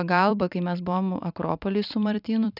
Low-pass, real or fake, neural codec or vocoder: 5.4 kHz; fake; vocoder, 44.1 kHz, 128 mel bands every 256 samples, BigVGAN v2